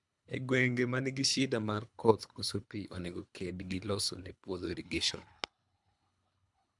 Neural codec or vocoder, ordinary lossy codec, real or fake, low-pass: codec, 24 kHz, 3 kbps, HILCodec; MP3, 96 kbps; fake; 10.8 kHz